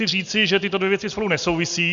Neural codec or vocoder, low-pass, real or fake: none; 7.2 kHz; real